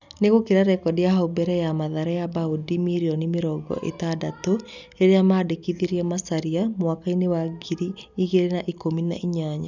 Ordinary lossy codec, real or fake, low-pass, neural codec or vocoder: none; real; 7.2 kHz; none